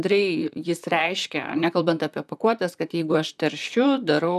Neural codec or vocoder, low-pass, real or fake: vocoder, 44.1 kHz, 128 mel bands, Pupu-Vocoder; 14.4 kHz; fake